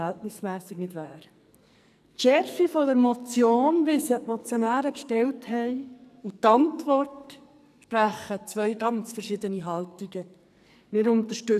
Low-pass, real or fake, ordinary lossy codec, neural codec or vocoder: 14.4 kHz; fake; none; codec, 44.1 kHz, 2.6 kbps, SNAC